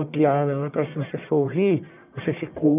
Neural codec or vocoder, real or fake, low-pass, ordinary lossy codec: codec, 44.1 kHz, 1.7 kbps, Pupu-Codec; fake; 3.6 kHz; none